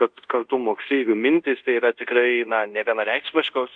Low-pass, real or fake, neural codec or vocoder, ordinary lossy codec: 9.9 kHz; fake; codec, 24 kHz, 0.5 kbps, DualCodec; MP3, 64 kbps